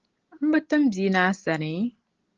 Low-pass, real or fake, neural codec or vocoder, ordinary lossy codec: 7.2 kHz; real; none; Opus, 16 kbps